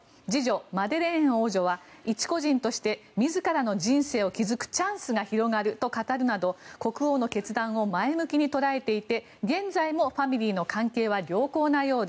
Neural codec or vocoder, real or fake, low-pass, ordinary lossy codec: none; real; none; none